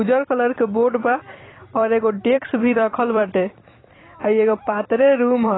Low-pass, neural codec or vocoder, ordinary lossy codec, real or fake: 7.2 kHz; vocoder, 44.1 kHz, 128 mel bands every 256 samples, BigVGAN v2; AAC, 16 kbps; fake